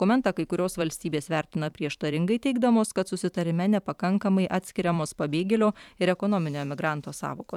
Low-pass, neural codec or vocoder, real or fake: 19.8 kHz; vocoder, 44.1 kHz, 128 mel bands every 512 samples, BigVGAN v2; fake